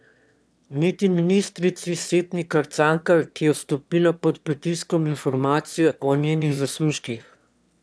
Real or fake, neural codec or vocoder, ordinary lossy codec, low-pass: fake; autoencoder, 22.05 kHz, a latent of 192 numbers a frame, VITS, trained on one speaker; none; none